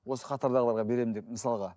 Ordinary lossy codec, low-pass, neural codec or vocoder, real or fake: none; none; none; real